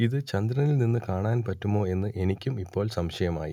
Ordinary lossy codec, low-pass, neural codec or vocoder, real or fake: none; 19.8 kHz; none; real